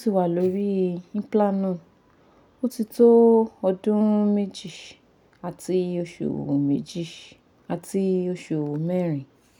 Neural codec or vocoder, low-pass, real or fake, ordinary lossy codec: none; 19.8 kHz; real; none